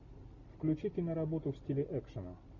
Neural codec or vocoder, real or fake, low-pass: none; real; 7.2 kHz